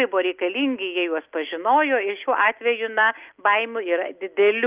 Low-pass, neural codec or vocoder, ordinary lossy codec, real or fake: 3.6 kHz; none; Opus, 32 kbps; real